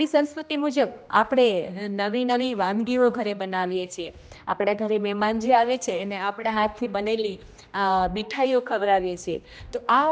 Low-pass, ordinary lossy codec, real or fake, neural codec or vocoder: none; none; fake; codec, 16 kHz, 1 kbps, X-Codec, HuBERT features, trained on general audio